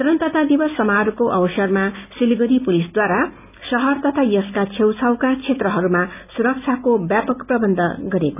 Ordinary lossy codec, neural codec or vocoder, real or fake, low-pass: none; none; real; 3.6 kHz